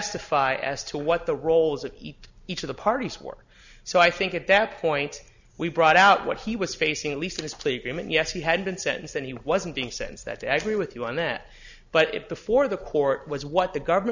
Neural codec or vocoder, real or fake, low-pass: none; real; 7.2 kHz